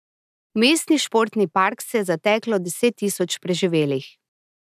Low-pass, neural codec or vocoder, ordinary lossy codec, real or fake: 14.4 kHz; none; none; real